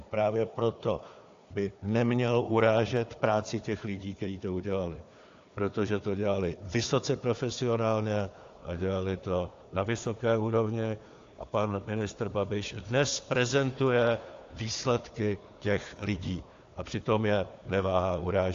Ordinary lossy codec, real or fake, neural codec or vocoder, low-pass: AAC, 48 kbps; fake; codec, 16 kHz, 4 kbps, FunCodec, trained on Chinese and English, 50 frames a second; 7.2 kHz